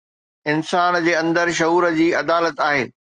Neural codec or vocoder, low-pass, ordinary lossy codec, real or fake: none; 9.9 kHz; Opus, 24 kbps; real